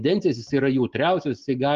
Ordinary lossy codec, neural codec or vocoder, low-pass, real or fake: Opus, 24 kbps; none; 7.2 kHz; real